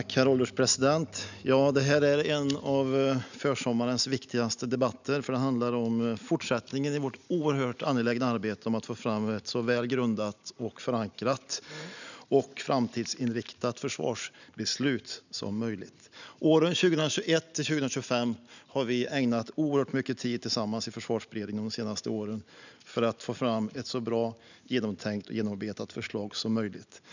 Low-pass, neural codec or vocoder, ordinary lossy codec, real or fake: 7.2 kHz; none; none; real